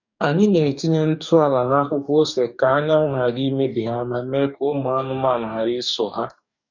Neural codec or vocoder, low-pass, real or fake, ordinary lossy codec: codec, 44.1 kHz, 2.6 kbps, DAC; 7.2 kHz; fake; none